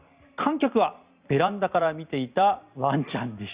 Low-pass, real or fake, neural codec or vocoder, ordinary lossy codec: 3.6 kHz; fake; vocoder, 44.1 kHz, 128 mel bands every 256 samples, BigVGAN v2; Opus, 64 kbps